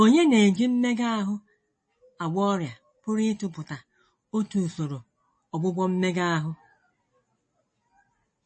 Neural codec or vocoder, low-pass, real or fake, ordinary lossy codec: none; 9.9 kHz; real; MP3, 32 kbps